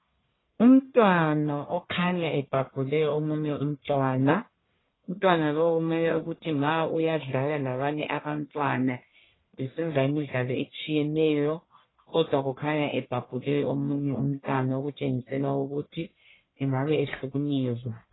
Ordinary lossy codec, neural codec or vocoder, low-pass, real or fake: AAC, 16 kbps; codec, 44.1 kHz, 1.7 kbps, Pupu-Codec; 7.2 kHz; fake